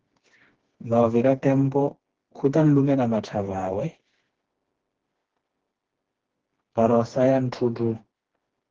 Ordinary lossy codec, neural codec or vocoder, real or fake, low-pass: Opus, 16 kbps; codec, 16 kHz, 2 kbps, FreqCodec, smaller model; fake; 7.2 kHz